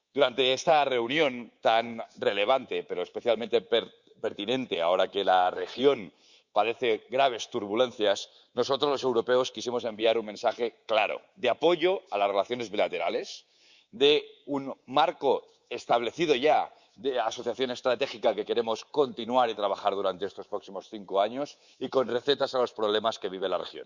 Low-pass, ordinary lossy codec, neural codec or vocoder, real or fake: 7.2 kHz; Opus, 64 kbps; codec, 24 kHz, 3.1 kbps, DualCodec; fake